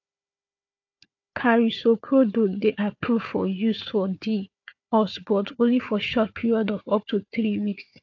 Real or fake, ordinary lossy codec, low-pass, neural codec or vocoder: fake; AAC, 48 kbps; 7.2 kHz; codec, 16 kHz, 4 kbps, FunCodec, trained on Chinese and English, 50 frames a second